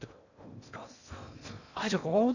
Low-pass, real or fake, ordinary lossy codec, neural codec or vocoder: 7.2 kHz; fake; none; codec, 16 kHz in and 24 kHz out, 0.6 kbps, FocalCodec, streaming, 2048 codes